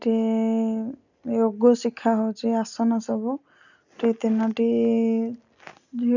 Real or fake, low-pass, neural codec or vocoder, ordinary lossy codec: real; 7.2 kHz; none; none